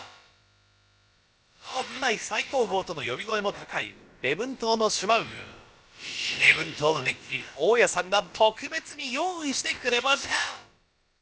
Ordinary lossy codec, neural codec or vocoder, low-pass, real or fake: none; codec, 16 kHz, about 1 kbps, DyCAST, with the encoder's durations; none; fake